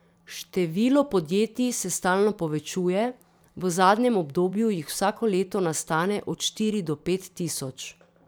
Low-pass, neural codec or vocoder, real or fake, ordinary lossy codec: none; vocoder, 44.1 kHz, 128 mel bands every 512 samples, BigVGAN v2; fake; none